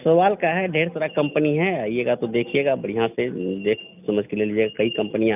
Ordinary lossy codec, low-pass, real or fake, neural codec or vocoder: none; 3.6 kHz; real; none